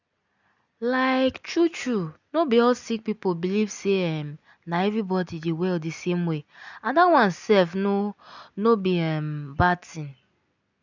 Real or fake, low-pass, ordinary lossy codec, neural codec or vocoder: real; 7.2 kHz; none; none